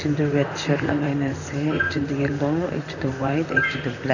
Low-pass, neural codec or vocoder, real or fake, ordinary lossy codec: 7.2 kHz; vocoder, 44.1 kHz, 128 mel bands, Pupu-Vocoder; fake; none